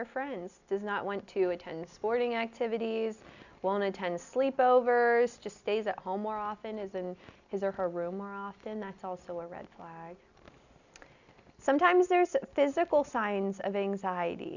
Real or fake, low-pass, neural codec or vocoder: real; 7.2 kHz; none